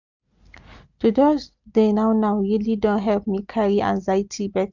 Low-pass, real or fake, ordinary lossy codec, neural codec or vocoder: 7.2 kHz; real; none; none